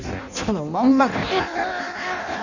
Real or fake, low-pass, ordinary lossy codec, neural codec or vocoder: fake; 7.2 kHz; none; codec, 16 kHz in and 24 kHz out, 0.6 kbps, FireRedTTS-2 codec